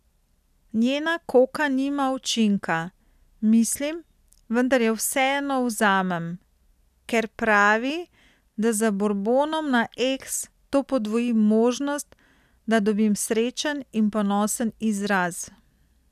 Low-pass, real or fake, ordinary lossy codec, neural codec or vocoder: 14.4 kHz; real; none; none